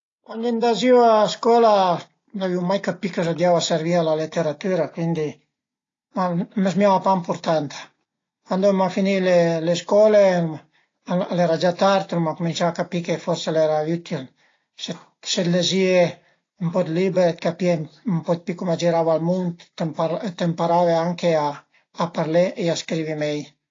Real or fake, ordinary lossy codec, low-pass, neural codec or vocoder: real; AAC, 32 kbps; 7.2 kHz; none